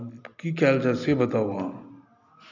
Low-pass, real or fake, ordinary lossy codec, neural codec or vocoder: 7.2 kHz; real; none; none